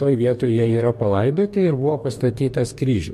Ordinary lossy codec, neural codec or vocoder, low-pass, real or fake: MP3, 64 kbps; codec, 44.1 kHz, 2.6 kbps, DAC; 14.4 kHz; fake